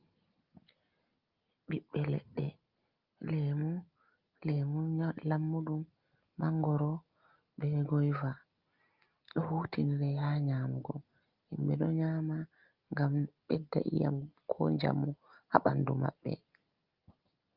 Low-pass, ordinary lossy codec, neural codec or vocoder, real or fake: 5.4 kHz; Opus, 24 kbps; none; real